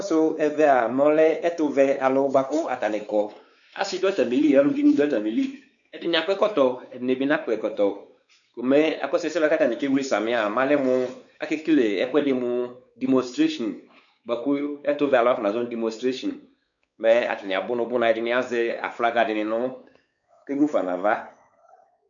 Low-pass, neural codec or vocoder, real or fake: 7.2 kHz; codec, 16 kHz, 4 kbps, X-Codec, WavLM features, trained on Multilingual LibriSpeech; fake